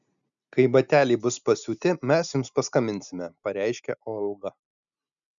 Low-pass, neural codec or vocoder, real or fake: 7.2 kHz; none; real